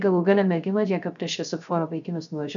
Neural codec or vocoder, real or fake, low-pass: codec, 16 kHz, 0.3 kbps, FocalCodec; fake; 7.2 kHz